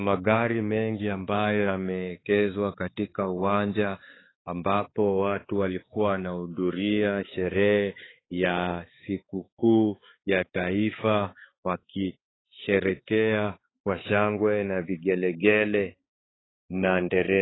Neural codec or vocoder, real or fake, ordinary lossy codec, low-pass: codec, 16 kHz, 4 kbps, X-Codec, HuBERT features, trained on balanced general audio; fake; AAC, 16 kbps; 7.2 kHz